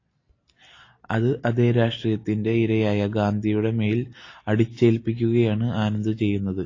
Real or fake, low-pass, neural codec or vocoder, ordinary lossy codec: real; 7.2 kHz; none; AAC, 32 kbps